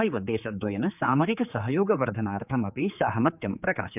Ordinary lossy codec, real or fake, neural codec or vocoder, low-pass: none; fake; codec, 16 kHz, 4 kbps, X-Codec, HuBERT features, trained on general audio; 3.6 kHz